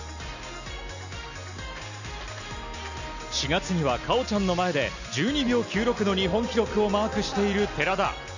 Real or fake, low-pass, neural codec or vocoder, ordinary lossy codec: real; 7.2 kHz; none; none